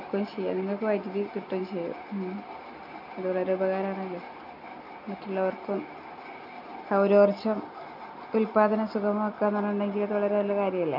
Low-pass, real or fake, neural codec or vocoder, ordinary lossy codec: 5.4 kHz; real; none; none